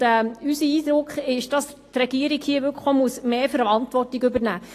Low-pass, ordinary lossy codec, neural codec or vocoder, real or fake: 14.4 kHz; AAC, 48 kbps; none; real